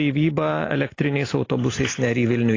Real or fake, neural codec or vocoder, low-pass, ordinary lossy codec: real; none; 7.2 kHz; AAC, 32 kbps